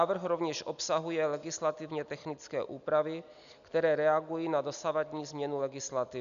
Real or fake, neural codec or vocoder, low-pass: real; none; 7.2 kHz